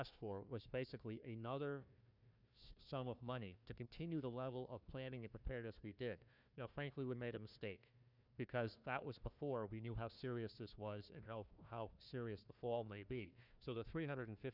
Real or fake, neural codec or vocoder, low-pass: fake; codec, 16 kHz, 1 kbps, FunCodec, trained on Chinese and English, 50 frames a second; 5.4 kHz